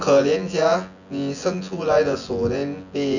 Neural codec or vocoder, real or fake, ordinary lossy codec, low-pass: vocoder, 24 kHz, 100 mel bands, Vocos; fake; none; 7.2 kHz